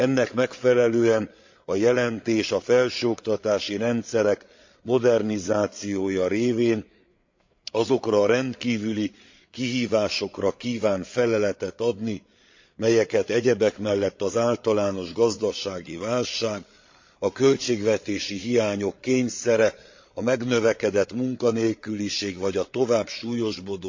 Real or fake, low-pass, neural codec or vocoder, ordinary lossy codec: fake; 7.2 kHz; codec, 16 kHz, 8 kbps, FreqCodec, larger model; MP3, 48 kbps